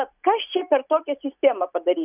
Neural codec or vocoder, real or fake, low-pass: none; real; 3.6 kHz